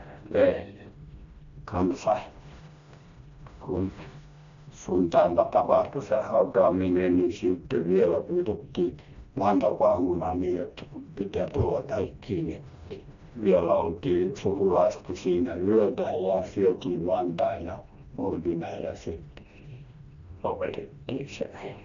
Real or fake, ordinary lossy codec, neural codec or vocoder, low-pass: fake; AAC, 64 kbps; codec, 16 kHz, 1 kbps, FreqCodec, smaller model; 7.2 kHz